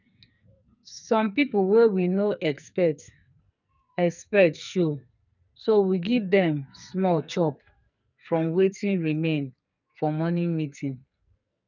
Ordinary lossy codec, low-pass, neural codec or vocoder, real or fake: none; 7.2 kHz; codec, 44.1 kHz, 2.6 kbps, SNAC; fake